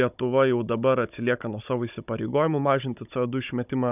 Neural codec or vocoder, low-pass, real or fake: codec, 16 kHz, 16 kbps, FunCodec, trained on Chinese and English, 50 frames a second; 3.6 kHz; fake